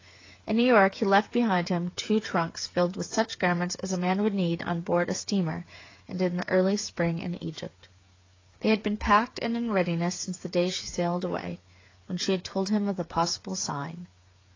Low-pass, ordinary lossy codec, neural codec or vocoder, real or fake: 7.2 kHz; AAC, 32 kbps; codec, 16 kHz, 8 kbps, FreqCodec, smaller model; fake